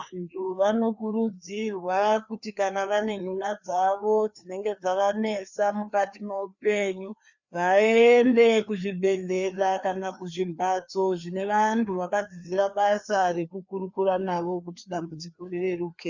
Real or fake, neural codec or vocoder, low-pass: fake; codec, 16 kHz, 2 kbps, FreqCodec, larger model; 7.2 kHz